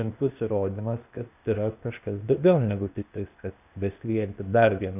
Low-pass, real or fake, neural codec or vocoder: 3.6 kHz; fake; codec, 16 kHz, 0.8 kbps, ZipCodec